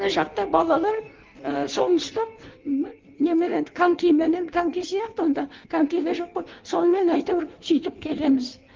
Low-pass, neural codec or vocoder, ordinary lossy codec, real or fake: 7.2 kHz; codec, 16 kHz in and 24 kHz out, 1.1 kbps, FireRedTTS-2 codec; Opus, 32 kbps; fake